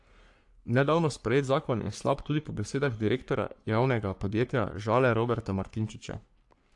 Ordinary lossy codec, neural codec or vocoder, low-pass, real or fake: AAC, 64 kbps; codec, 44.1 kHz, 3.4 kbps, Pupu-Codec; 10.8 kHz; fake